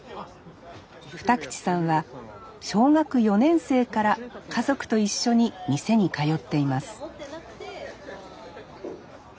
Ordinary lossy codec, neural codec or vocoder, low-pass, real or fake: none; none; none; real